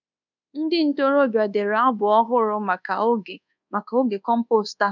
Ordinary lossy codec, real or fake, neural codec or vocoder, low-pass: none; fake; codec, 24 kHz, 1.2 kbps, DualCodec; 7.2 kHz